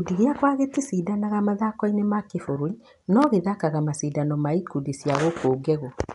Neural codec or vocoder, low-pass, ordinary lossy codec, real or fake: none; 10.8 kHz; none; real